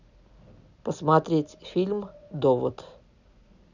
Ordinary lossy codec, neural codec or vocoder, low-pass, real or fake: none; none; 7.2 kHz; real